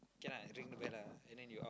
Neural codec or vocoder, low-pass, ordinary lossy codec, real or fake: none; none; none; real